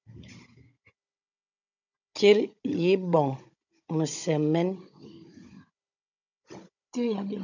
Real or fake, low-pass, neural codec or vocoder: fake; 7.2 kHz; codec, 16 kHz, 16 kbps, FunCodec, trained on Chinese and English, 50 frames a second